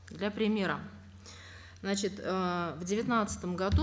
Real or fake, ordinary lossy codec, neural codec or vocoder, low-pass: real; none; none; none